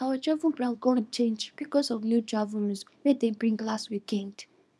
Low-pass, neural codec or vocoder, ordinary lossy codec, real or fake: none; codec, 24 kHz, 0.9 kbps, WavTokenizer, small release; none; fake